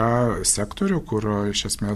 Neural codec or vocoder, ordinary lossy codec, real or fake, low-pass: none; AAC, 96 kbps; real; 14.4 kHz